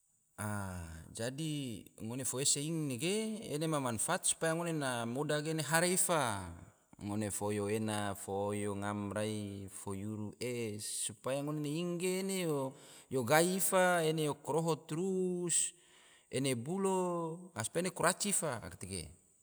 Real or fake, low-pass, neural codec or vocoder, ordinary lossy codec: real; none; none; none